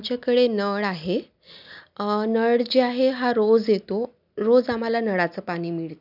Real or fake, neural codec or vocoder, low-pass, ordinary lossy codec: real; none; 5.4 kHz; none